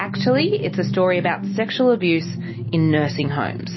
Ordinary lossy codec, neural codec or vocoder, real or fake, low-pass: MP3, 24 kbps; none; real; 7.2 kHz